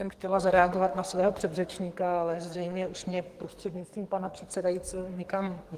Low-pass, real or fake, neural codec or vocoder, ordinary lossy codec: 14.4 kHz; fake; codec, 44.1 kHz, 2.6 kbps, SNAC; Opus, 24 kbps